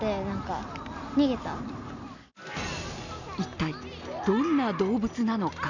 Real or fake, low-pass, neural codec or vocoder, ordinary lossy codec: real; 7.2 kHz; none; none